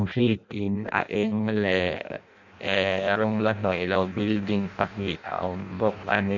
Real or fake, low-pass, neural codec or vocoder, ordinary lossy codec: fake; 7.2 kHz; codec, 16 kHz in and 24 kHz out, 0.6 kbps, FireRedTTS-2 codec; none